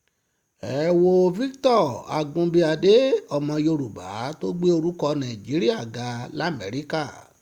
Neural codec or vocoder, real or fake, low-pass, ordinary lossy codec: none; real; 19.8 kHz; Opus, 64 kbps